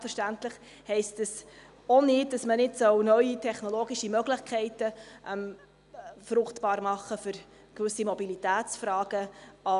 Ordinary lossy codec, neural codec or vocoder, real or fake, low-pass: none; none; real; 10.8 kHz